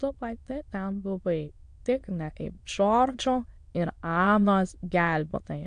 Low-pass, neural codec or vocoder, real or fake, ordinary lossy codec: 9.9 kHz; autoencoder, 22.05 kHz, a latent of 192 numbers a frame, VITS, trained on many speakers; fake; AAC, 64 kbps